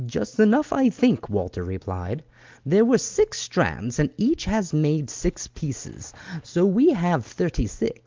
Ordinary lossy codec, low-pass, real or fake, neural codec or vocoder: Opus, 32 kbps; 7.2 kHz; real; none